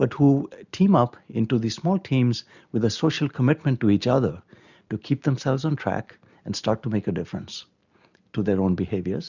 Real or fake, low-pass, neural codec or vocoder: real; 7.2 kHz; none